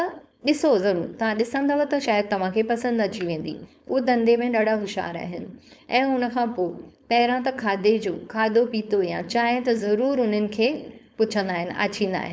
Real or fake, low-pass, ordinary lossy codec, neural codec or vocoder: fake; none; none; codec, 16 kHz, 4.8 kbps, FACodec